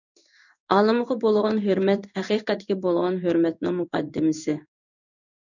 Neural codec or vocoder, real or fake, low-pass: codec, 16 kHz in and 24 kHz out, 1 kbps, XY-Tokenizer; fake; 7.2 kHz